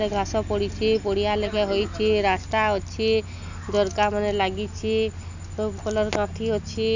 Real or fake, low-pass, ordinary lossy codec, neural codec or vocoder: real; 7.2 kHz; none; none